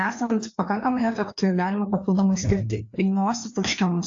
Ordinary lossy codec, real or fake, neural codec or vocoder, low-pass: AAC, 48 kbps; fake; codec, 16 kHz, 2 kbps, FreqCodec, larger model; 7.2 kHz